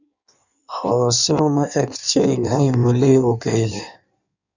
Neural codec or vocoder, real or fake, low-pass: codec, 16 kHz in and 24 kHz out, 1.1 kbps, FireRedTTS-2 codec; fake; 7.2 kHz